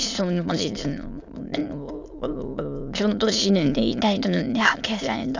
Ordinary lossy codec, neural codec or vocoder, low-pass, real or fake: none; autoencoder, 22.05 kHz, a latent of 192 numbers a frame, VITS, trained on many speakers; 7.2 kHz; fake